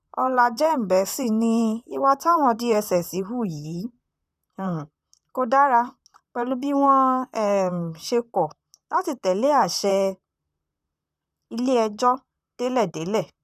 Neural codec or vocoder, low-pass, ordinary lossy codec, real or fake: vocoder, 44.1 kHz, 128 mel bands, Pupu-Vocoder; 14.4 kHz; none; fake